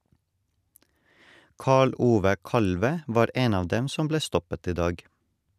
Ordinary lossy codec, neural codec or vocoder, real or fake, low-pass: none; none; real; 14.4 kHz